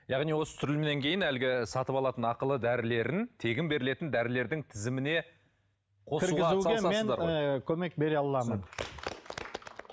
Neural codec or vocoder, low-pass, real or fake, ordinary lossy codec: none; none; real; none